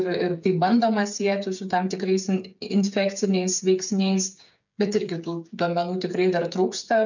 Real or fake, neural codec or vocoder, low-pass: fake; codec, 16 kHz, 8 kbps, FreqCodec, smaller model; 7.2 kHz